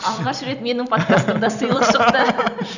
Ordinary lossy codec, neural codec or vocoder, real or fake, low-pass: none; vocoder, 44.1 kHz, 128 mel bands every 256 samples, BigVGAN v2; fake; 7.2 kHz